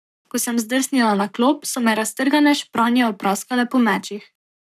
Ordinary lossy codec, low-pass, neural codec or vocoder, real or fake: none; 14.4 kHz; codec, 44.1 kHz, 7.8 kbps, Pupu-Codec; fake